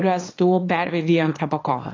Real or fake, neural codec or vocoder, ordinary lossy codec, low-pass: fake; codec, 24 kHz, 0.9 kbps, WavTokenizer, small release; AAC, 32 kbps; 7.2 kHz